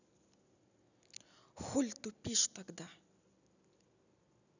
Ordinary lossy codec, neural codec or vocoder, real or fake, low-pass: none; none; real; 7.2 kHz